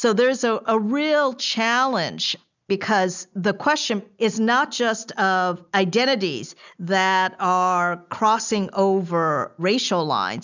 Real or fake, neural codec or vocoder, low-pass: real; none; 7.2 kHz